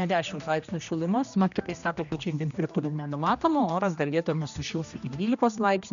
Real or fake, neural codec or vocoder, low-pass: fake; codec, 16 kHz, 1 kbps, X-Codec, HuBERT features, trained on general audio; 7.2 kHz